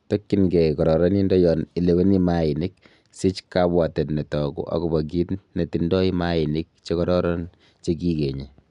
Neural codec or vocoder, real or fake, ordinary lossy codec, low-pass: none; real; none; 10.8 kHz